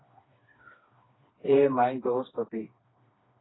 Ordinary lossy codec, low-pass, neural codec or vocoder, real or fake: AAC, 16 kbps; 7.2 kHz; codec, 16 kHz, 2 kbps, FreqCodec, smaller model; fake